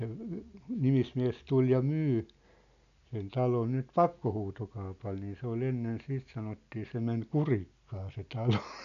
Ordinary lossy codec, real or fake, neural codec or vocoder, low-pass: none; real; none; 7.2 kHz